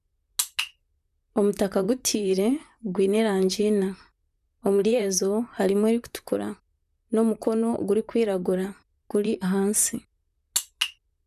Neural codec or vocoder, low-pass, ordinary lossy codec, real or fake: vocoder, 44.1 kHz, 128 mel bands, Pupu-Vocoder; 14.4 kHz; none; fake